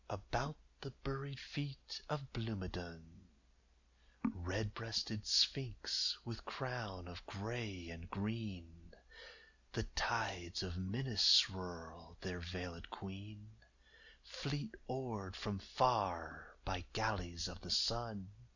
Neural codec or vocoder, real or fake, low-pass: none; real; 7.2 kHz